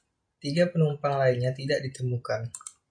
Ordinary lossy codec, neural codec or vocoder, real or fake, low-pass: MP3, 48 kbps; none; real; 9.9 kHz